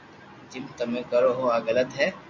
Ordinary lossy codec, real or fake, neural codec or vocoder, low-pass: MP3, 48 kbps; real; none; 7.2 kHz